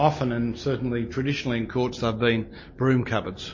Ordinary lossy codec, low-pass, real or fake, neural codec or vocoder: MP3, 32 kbps; 7.2 kHz; real; none